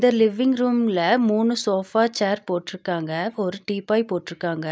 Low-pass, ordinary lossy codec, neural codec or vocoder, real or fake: none; none; none; real